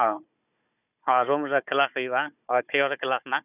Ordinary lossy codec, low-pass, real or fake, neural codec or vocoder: none; 3.6 kHz; fake; codec, 16 kHz, 4 kbps, FunCodec, trained on Chinese and English, 50 frames a second